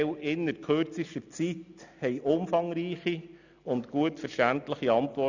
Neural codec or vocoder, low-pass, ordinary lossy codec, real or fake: none; 7.2 kHz; none; real